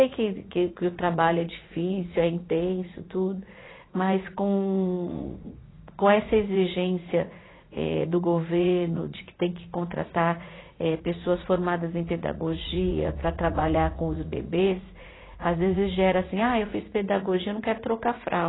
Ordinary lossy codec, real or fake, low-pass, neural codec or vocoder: AAC, 16 kbps; fake; 7.2 kHz; vocoder, 44.1 kHz, 80 mel bands, Vocos